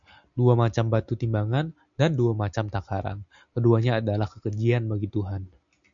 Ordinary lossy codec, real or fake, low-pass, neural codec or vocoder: AAC, 64 kbps; real; 7.2 kHz; none